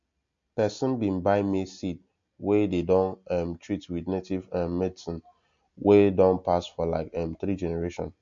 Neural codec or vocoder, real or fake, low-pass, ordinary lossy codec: none; real; 7.2 kHz; MP3, 48 kbps